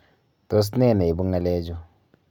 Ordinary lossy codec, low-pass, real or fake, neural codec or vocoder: none; 19.8 kHz; real; none